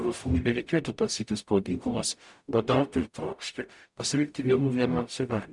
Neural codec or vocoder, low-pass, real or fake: codec, 44.1 kHz, 0.9 kbps, DAC; 10.8 kHz; fake